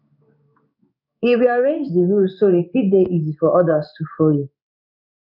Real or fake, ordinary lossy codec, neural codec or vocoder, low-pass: fake; none; codec, 16 kHz in and 24 kHz out, 1 kbps, XY-Tokenizer; 5.4 kHz